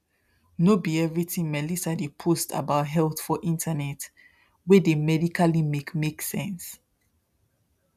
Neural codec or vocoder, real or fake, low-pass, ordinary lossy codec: none; real; 14.4 kHz; none